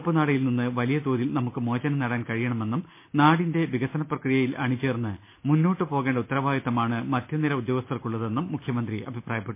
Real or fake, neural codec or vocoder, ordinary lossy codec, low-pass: real; none; MP3, 24 kbps; 3.6 kHz